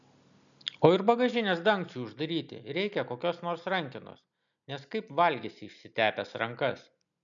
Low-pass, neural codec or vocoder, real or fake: 7.2 kHz; none; real